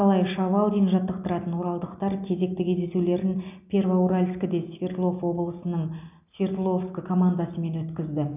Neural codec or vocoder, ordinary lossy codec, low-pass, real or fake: none; none; 3.6 kHz; real